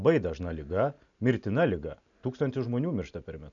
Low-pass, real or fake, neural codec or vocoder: 7.2 kHz; real; none